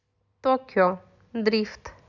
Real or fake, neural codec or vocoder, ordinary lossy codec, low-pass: real; none; none; 7.2 kHz